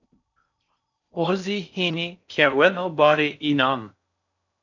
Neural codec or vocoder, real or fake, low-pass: codec, 16 kHz in and 24 kHz out, 0.6 kbps, FocalCodec, streaming, 4096 codes; fake; 7.2 kHz